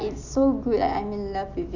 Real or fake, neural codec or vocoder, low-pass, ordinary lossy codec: real; none; 7.2 kHz; none